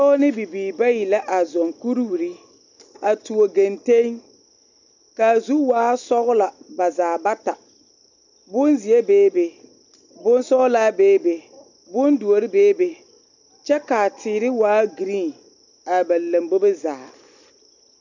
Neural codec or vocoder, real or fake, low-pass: none; real; 7.2 kHz